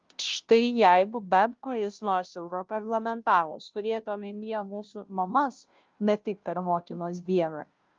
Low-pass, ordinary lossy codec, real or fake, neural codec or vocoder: 7.2 kHz; Opus, 32 kbps; fake; codec, 16 kHz, 0.5 kbps, FunCodec, trained on Chinese and English, 25 frames a second